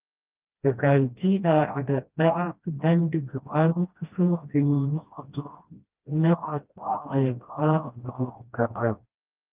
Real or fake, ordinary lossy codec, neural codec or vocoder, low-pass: fake; Opus, 32 kbps; codec, 16 kHz, 1 kbps, FreqCodec, smaller model; 3.6 kHz